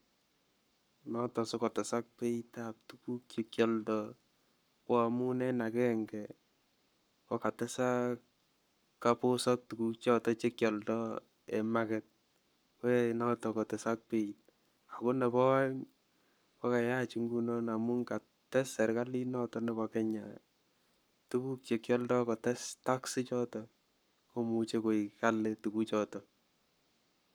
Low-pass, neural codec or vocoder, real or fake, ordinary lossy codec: none; codec, 44.1 kHz, 7.8 kbps, Pupu-Codec; fake; none